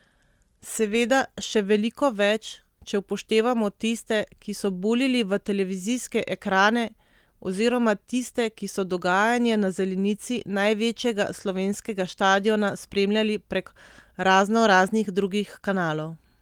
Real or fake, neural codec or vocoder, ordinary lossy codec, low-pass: real; none; Opus, 24 kbps; 19.8 kHz